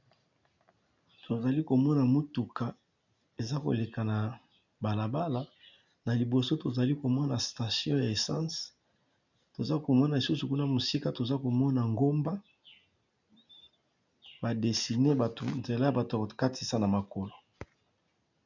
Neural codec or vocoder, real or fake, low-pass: none; real; 7.2 kHz